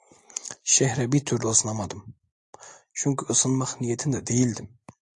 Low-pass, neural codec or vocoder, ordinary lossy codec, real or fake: 10.8 kHz; none; AAC, 64 kbps; real